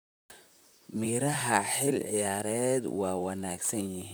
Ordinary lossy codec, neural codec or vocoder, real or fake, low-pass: none; vocoder, 44.1 kHz, 128 mel bands, Pupu-Vocoder; fake; none